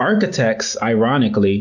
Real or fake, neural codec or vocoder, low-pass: real; none; 7.2 kHz